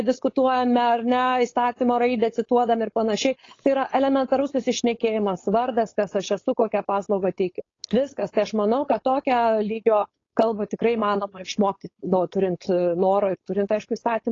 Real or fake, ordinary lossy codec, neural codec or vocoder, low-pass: fake; AAC, 32 kbps; codec, 16 kHz, 4.8 kbps, FACodec; 7.2 kHz